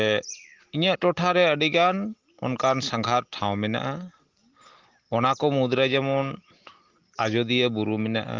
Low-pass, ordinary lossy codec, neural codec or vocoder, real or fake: 7.2 kHz; Opus, 16 kbps; none; real